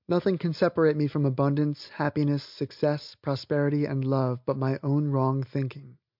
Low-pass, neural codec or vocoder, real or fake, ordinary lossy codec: 5.4 kHz; none; real; MP3, 48 kbps